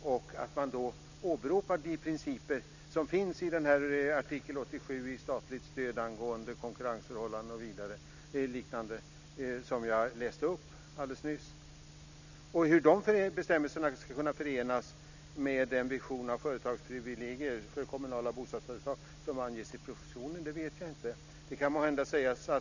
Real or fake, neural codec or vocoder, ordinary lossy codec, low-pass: real; none; none; 7.2 kHz